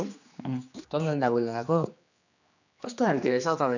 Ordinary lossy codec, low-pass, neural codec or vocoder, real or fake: none; 7.2 kHz; codec, 16 kHz, 2 kbps, X-Codec, HuBERT features, trained on general audio; fake